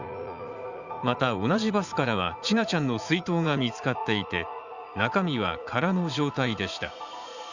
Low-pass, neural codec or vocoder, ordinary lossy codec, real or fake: 7.2 kHz; vocoder, 44.1 kHz, 80 mel bands, Vocos; Opus, 64 kbps; fake